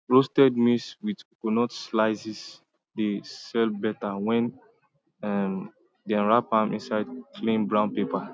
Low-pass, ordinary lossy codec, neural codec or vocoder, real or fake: none; none; none; real